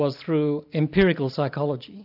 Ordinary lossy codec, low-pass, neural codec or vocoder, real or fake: AAC, 48 kbps; 5.4 kHz; none; real